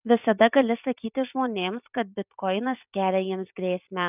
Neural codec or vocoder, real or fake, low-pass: vocoder, 44.1 kHz, 128 mel bands every 256 samples, BigVGAN v2; fake; 3.6 kHz